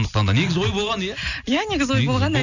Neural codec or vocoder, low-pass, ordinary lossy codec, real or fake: none; 7.2 kHz; none; real